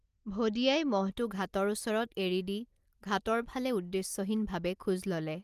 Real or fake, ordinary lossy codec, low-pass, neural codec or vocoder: real; Opus, 24 kbps; 14.4 kHz; none